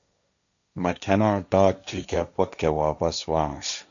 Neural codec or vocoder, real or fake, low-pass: codec, 16 kHz, 1.1 kbps, Voila-Tokenizer; fake; 7.2 kHz